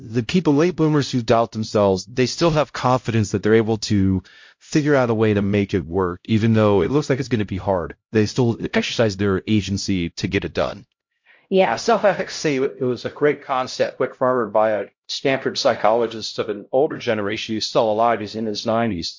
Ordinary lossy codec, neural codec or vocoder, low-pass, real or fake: MP3, 48 kbps; codec, 16 kHz, 0.5 kbps, X-Codec, HuBERT features, trained on LibriSpeech; 7.2 kHz; fake